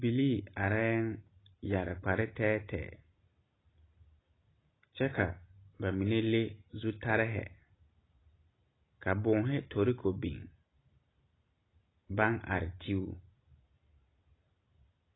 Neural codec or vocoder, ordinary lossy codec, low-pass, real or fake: none; AAC, 16 kbps; 7.2 kHz; real